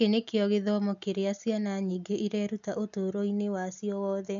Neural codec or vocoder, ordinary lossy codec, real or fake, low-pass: none; none; real; 7.2 kHz